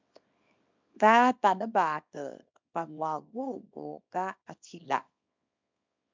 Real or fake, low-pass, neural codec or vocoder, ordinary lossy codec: fake; 7.2 kHz; codec, 24 kHz, 0.9 kbps, WavTokenizer, small release; MP3, 64 kbps